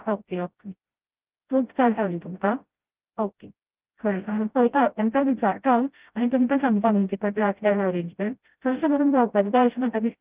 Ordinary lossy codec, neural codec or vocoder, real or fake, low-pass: Opus, 32 kbps; codec, 16 kHz, 0.5 kbps, FreqCodec, smaller model; fake; 3.6 kHz